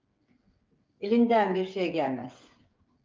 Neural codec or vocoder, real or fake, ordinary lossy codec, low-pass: codec, 16 kHz, 8 kbps, FreqCodec, smaller model; fake; Opus, 24 kbps; 7.2 kHz